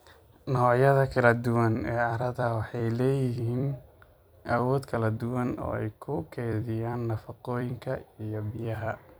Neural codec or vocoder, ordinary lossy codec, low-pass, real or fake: vocoder, 44.1 kHz, 128 mel bands every 256 samples, BigVGAN v2; none; none; fake